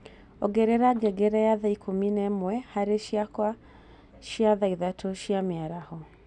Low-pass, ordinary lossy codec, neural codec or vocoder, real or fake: 10.8 kHz; none; none; real